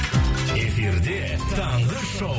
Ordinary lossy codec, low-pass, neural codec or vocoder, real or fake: none; none; none; real